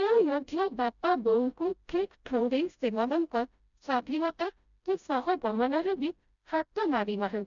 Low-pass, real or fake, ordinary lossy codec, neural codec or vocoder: 7.2 kHz; fake; none; codec, 16 kHz, 0.5 kbps, FreqCodec, smaller model